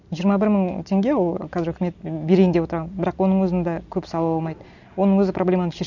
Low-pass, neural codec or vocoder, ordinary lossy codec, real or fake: 7.2 kHz; none; none; real